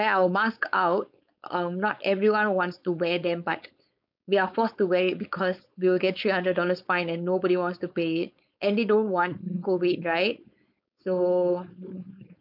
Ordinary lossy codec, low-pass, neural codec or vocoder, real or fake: none; 5.4 kHz; codec, 16 kHz, 4.8 kbps, FACodec; fake